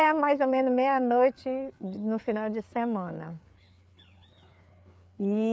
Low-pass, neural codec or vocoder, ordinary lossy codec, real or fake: none; codec, 16 kHz, 4 kbps, FreqCodec, larger model; none; fake